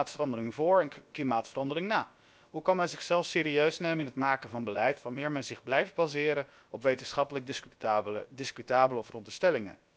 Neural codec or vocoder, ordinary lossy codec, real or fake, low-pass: codec, 16 kHz, about 1 kbps, DyCAST, with the encoder's durations; none; fake; none